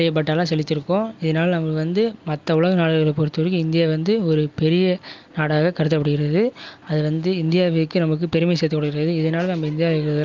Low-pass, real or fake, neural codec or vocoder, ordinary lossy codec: 7.2 kHz; real; none; Opus, 32 kbps